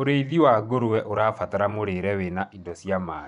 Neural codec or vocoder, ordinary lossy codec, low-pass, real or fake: vocoder, 24 kHz, 100 mel bands, Vocos; none; 10.8 kHz; fake